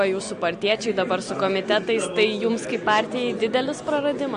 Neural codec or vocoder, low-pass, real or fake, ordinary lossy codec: none; 9.9 kHz; real; MP3, 48 kbps